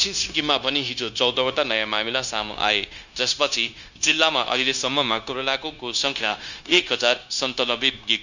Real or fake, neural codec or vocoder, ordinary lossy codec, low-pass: fake; codec, 16 kHz, 0.9 kbps, LongCat-Audio-Codec; none; 7.2 kHz